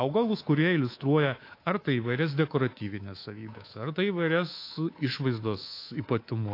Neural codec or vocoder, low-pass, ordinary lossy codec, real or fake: codec, 24 kHz, 3.1 kbps, DualCodec; 5.4 kHz; AAC, 32 kbps; fake